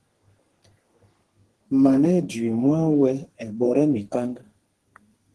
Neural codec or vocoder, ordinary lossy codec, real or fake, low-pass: codec, 32 kHz, 1.9 kbps, SNAC; Opus, 16 kbps; fake; 10.8 kHz